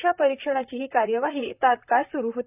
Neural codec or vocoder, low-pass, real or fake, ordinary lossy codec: vocoder, 44.1 kHz, 128 mel bands, Pupu-Vocoder; 3.6 kHz; fake; none